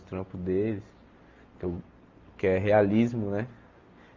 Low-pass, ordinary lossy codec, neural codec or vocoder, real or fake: 7.2 kHz; Opus, 32 kbps; none; real